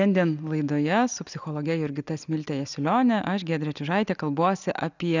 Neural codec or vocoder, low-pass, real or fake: none; 7.2 kHz; real